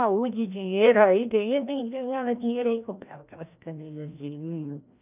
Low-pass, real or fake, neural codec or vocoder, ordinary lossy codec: 3.6 kHz; fake; codec, 16 kHz, 1 kbps, FreqCodec, larger model; none